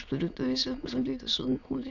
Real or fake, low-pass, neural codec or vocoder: fake; 7.2 kHz; autoencoder, 22.05 kHz, a latent of 192 numbers a frame, VITS, trained on many speakers